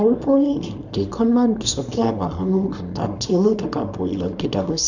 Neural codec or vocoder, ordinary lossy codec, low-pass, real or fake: codec, 24 kHz, 0.9 kbps, WavTokenizer, small release; none; 7.2 kHz; fake